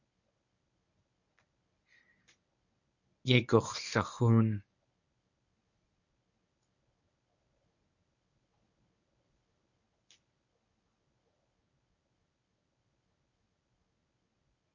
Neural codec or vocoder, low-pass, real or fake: codec, 24 kHz, 0.9 kbps, WavTokenizer, medium speech release version 1; 7.2 kHz; fake